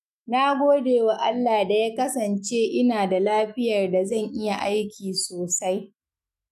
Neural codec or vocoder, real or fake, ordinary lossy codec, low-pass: autoencoder, 48 kHz, 128 numbers a frame, DAC-VAE, trained on Japanese speech; fake; none; 14.4 kHz